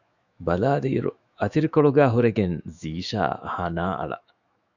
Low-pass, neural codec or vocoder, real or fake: 7.2 kHz; autoencoder, 48 kHz, 128 numbers a frame, DAC-VAE, trained on Japanese speech; fake